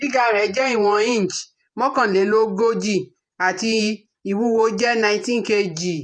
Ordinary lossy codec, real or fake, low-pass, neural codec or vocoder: none; fake; 9.9 kHz; vocoder, 48 kHz, 128 mel bands, Vocos